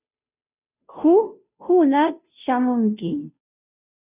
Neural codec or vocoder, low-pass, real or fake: codec, 16 kHz, 0.5 kbps, FunCodec, trained on Chinese and English, 25 frames a second; 3.6 kHz; fake